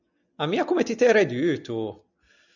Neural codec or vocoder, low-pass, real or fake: none; 7.2 kHz; real